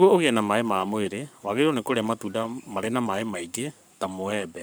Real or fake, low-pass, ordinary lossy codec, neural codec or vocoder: fake; none; none; codec, 44.1 kHz, 7.8 kbps, Pupu-Codec